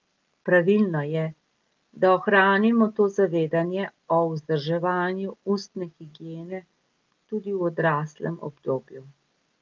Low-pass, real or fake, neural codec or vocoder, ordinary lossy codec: 7.2 kHz; real; none; Opus, 32 kbps